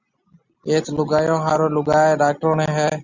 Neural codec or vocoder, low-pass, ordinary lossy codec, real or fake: none; 7.2 kHz; Opus, 64 kbps; real